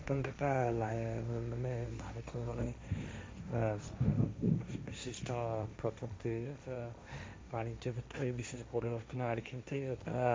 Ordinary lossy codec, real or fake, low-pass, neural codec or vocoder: none; fake; none; codec, 16 kHz, 1.1 kbps, Voila-Tokenizer